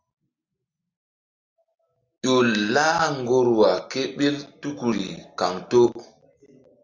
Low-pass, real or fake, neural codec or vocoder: 7.2 kHz; real; none